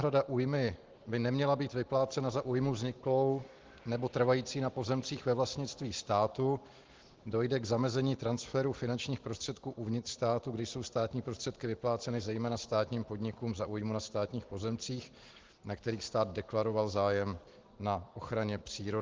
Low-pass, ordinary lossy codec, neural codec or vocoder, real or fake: 7.2 kHz; Opus, 16 kbps; none; real